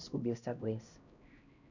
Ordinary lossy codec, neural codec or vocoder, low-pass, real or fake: none; codec, 16 kHz, 1 kbps, X-Codec, HuBERT features, trained on LibriSpeech; 7.2 kHz; fake